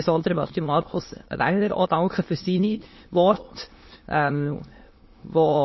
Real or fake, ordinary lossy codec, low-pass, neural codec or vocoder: fake; MP3, 24 kbps; 7.2 kHz; autoencoder, 22.05 kHz, a latent of 192 numbers a frame, VITS, trained on many speakers